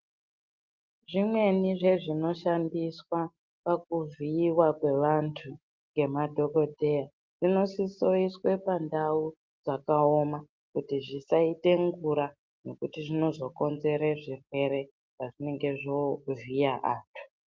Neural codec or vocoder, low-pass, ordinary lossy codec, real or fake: none; 7.2 kHz; Opus, 32 kbps; real